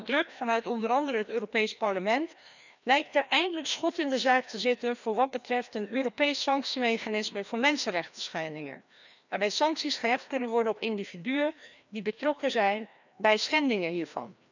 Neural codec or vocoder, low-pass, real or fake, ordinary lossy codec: codec, 16 kHz, 1 kbps, FreqCodec, larger model; 7.2 kHz; fake; none